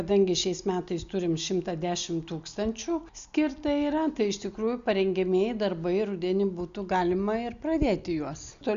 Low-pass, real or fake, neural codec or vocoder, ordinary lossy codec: 7.2 kHz; real; none; Opus, 64 kbps